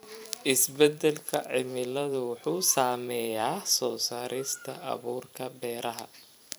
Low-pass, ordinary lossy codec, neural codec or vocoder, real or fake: none; none; vocoder, 44.1 kHz, 128 mel bands every 512 samples, BigVGAN v2; fake